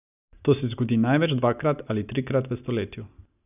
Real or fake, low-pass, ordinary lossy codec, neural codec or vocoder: real; 3.6 kHz; none; none